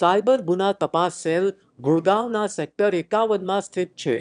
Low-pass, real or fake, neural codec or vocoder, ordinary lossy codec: 9.9 kHz; fake; autoencoder, 22.05 kHz, a latent of 192 numbers a frame, VITS, trained on one speaker; none